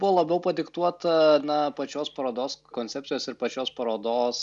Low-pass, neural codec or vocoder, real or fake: 10.8 kHz; none; real